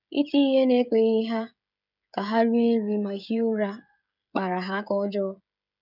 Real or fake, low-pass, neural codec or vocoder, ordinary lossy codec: fake; 5.4 kHz; codec, 16 kHz, 16 kbps, FreqCodec, smaller model; none